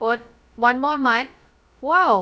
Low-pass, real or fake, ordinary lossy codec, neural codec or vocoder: none; fake; none; codec, 16 kHz, about 1 kbps, DyCAST, with the encoder's durations